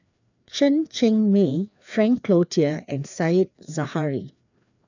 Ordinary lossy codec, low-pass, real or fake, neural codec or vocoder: none; 7.2 kHz; fake; codec, 16 kHz, 2 kbps, FreqCodec, larger model